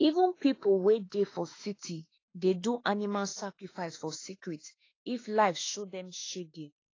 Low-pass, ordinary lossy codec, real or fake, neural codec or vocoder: 7.2 kHz; AAC, 32 kbps; fake; autoencoder, 48 kHz, 32 numbers a frame, DAC-VAE, trained on Japanese speech